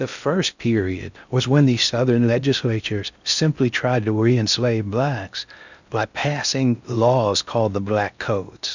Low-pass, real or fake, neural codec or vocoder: 7.2 kHz; fake; codec, 16 kHz in and 24 kHz out, 0.6 kbps, FocalCodec, streaming, 2048 codes